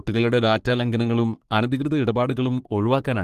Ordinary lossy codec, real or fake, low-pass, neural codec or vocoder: Opus, 24 kbps; fake; 14.4 kHz; codec, 44.1 kHz, 3.4 kbps, Pupu-Codec